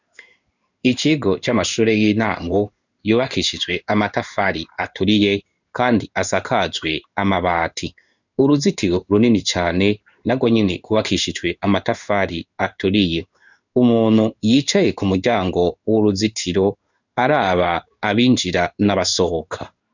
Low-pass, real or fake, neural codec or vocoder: 7.2 kHz; fake; codec, 16 kHz in and 24 kHz out, 1 kbps, XY-Tokenizer